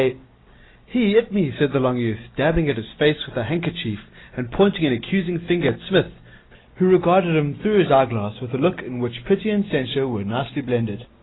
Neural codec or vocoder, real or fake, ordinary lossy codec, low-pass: none; real; AAC, 16 kbps; 7.2 kHz